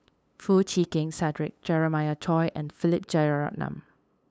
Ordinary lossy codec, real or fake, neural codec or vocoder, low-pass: none; fake; codec, 16 kHz, 8 kbps, FunCodec, trained on LibriTTS, 25 frames a second; none